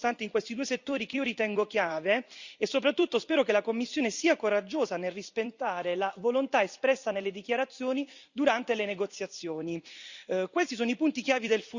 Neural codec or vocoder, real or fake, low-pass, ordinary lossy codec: vocoder, 44.1 kHz, 128 mel bands every 256 samples, BigVGAN v2; fake; 7.2 kHz; Opus, 64 kbps